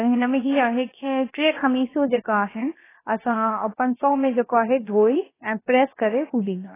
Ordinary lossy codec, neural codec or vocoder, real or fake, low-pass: AAC, 16 kbps; codec, 16 kHz, about 1 kbps, DyCAST, with the encoder's durations; fake; 3.6 kHz